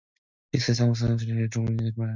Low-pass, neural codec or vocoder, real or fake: 7.2 kHz; none; real